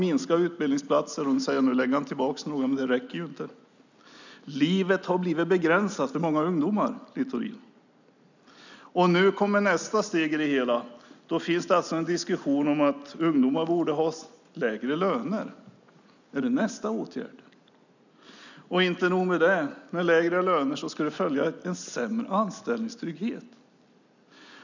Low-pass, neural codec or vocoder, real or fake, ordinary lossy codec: 7.2 kHz; none; real; none